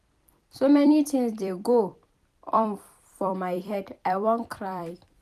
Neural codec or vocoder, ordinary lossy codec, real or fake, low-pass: vocoder, 44.1 kHz, 128 mel bands every 256 samples, BigVGAN v2; none; fake; 14.4 kHz